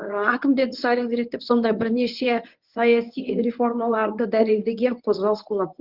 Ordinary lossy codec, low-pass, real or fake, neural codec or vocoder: Opus, 32 kbps; 5.4 kHz; fake; codec, 24 kHz, 0.9 kbps, WavTokenizer, medium speech release version 1